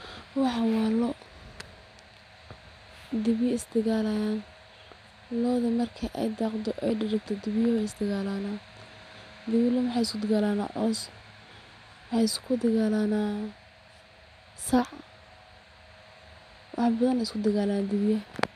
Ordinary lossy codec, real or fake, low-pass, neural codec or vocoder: none; real; 14.4 kHz; none